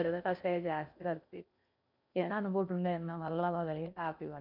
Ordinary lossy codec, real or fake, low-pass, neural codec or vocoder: none; fake; 5.4 kHz; codec, 16 kHz in and 24 kHz out, 0.6 kbps, FocalCodec, streaming, 4096 codes